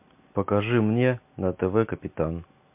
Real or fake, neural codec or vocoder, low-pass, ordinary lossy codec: real; none; 3.6 kHz; MP3, 32 kbps